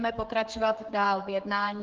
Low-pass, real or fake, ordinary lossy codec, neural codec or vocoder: 7.2 kHz; fake; Opus, 16 kbps; codec, 16 kHz, 4 kbps, X-Codec, HuBERT features, trained on general audio